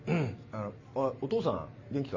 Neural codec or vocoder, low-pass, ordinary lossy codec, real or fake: none; 7.2 kHz; MP3, 32 kbps; real